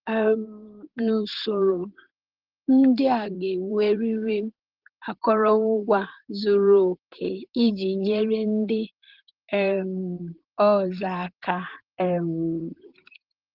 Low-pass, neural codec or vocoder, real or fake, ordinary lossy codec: 5.4 kHz; vocoder, 44.1 kHz, 128 mel bands, Pupu-Vocoder; fake; Opus, 16 kbps